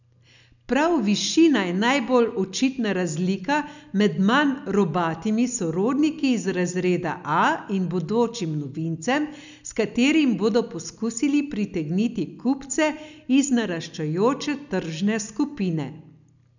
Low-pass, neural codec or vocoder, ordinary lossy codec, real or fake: 7.2 kHz; none; none; real